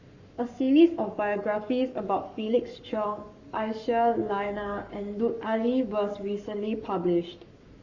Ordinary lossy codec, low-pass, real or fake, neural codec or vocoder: Opus, 64 kbps; 7.2 kHz; fake; codec, 44.1 kHz, 7.8 kbps, Pupu-Codec